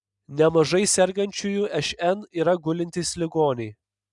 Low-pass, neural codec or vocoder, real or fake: 10.8 kHz; none; real